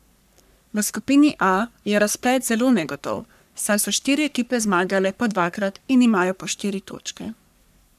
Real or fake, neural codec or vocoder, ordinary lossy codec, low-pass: fake; codec, 44.1 kHz, 3.4 kbps, Pupu-Codec; none; 14.4 kHz